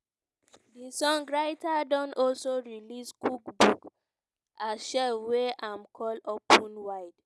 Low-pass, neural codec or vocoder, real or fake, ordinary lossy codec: none; none; real; none